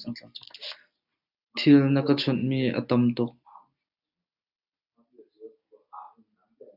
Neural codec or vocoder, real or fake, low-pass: none; real; 5.4 kHz